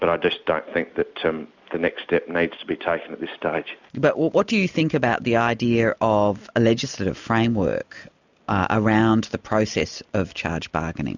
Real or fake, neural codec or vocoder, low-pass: real; none; 7.2 kHz